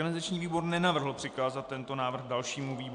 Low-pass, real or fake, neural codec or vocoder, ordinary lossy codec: 9.9 kHz; real; none; AAC, 64 kbps